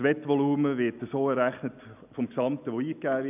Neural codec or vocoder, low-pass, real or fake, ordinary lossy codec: none; 3.6 kHz; real; none